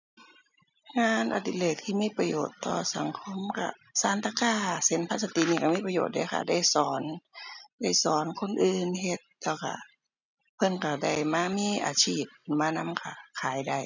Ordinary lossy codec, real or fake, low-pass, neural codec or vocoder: none; real; 7.2 kHz; none